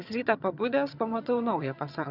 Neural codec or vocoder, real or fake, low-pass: vocoder, 22.05 kHz, 80 mel bands, HiFi-GAN; fake; 5.4 kHz